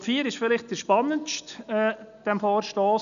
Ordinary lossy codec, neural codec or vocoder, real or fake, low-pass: none; none; real; 7.2 kHz